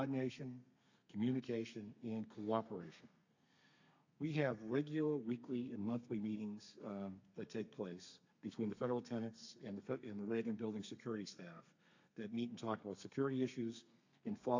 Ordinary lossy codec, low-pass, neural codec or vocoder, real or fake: AAC, 48 kbps; 7.2 kHz; codec, 32 kHz, 1.9 kbps, SNAC; fake